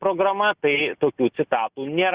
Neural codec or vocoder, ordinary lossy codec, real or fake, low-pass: none; Opus, 64 kbps; real; 3.6 kHz